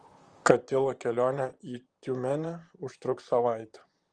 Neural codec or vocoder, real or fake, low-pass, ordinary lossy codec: vocoder, 44.1 kHz, 128 mel bands, Pupu-Vocoder; fake; 9.9 kHz; Opus, 24 kbps